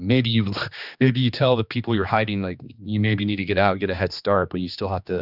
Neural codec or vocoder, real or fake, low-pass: codec, 16 kHz, 2 kbps, X-Codec, HuBERT features, trained on general audio; fake; 5.4 kHz